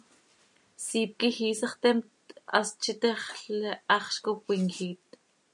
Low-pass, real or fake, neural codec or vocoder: 10.8 kHz; real; none